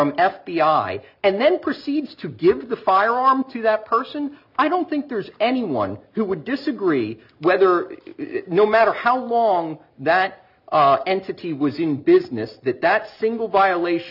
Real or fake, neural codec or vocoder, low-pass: real; none; 5.4 kHz